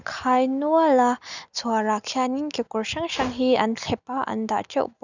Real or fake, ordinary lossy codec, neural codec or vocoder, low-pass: real; none; none; 7.2 kHz